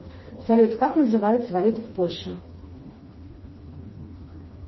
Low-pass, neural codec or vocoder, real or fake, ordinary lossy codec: 7.2 kHz; codec, 16 kHz, 2 kbps, FreqCodec, smaller model; fake; MP3, 24 kbps